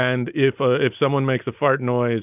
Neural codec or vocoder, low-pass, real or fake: none; 3.6 kHz; real